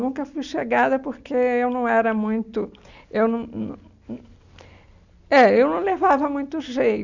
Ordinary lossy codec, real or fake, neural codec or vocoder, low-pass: none; real; none; 7.2 kHz